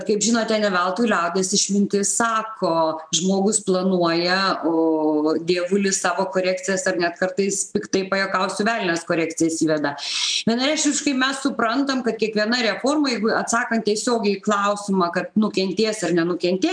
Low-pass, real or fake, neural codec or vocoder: 9.9 kHz; real; none